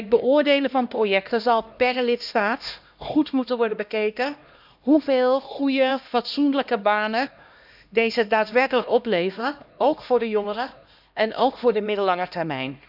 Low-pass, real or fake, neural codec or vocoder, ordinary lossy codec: 5.4 kHz; fake; codec, 16 kHz, 1 kbps, X-Codec, HuBERT features, trained on LibriSpeech; none